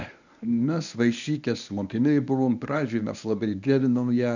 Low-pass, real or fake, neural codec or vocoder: 7.2 kHz; fake; codec, 24 kHz, 0.9 kbps, WavTokenizer, medium speech release version 1